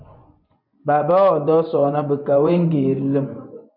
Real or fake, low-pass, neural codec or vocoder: fake; 5.4 kHz; vocoder, 24 kHz, 100 mel bands, Vocos